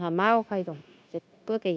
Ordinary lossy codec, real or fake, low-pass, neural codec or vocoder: none; fake; none; codec, 16 kHz, 0.9 kbps, LongCat-Audio-Codec